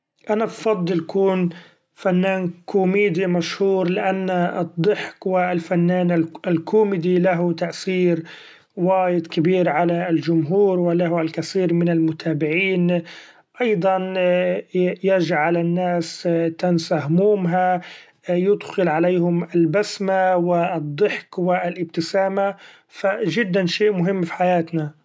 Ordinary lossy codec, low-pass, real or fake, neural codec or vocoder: none; none; real; none